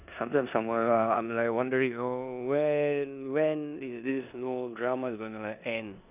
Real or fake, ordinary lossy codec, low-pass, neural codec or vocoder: fake; none; 3.6 kHz; codec, 16 kHz in and 24 kHz out, 0.9 kbps, LongCat-Audio-Codec, four codebook decoder